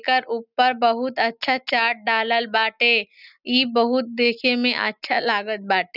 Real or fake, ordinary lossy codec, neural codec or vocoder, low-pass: real; none; none; 5.4 kHz